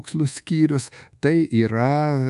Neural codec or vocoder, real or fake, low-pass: codec, 24 kHz, 1.2 kbps, DualCodec; fake; 10.8 kHz